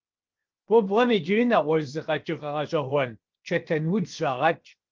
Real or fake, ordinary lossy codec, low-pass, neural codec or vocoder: fake; Opus, 32 kbps; 7.2 kHz; codec, 16 kHz, 0.7 kbps, FocalCodec